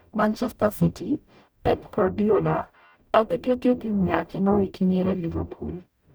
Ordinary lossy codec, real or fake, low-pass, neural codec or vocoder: none; fake; none; codec, 44.1 kHz, 0.9 kbps, DAC